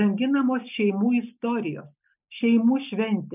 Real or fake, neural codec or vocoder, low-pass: real; none; 3.6 kHz